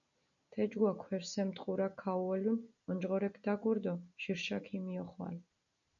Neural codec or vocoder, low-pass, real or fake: none; 7.2 kHz; real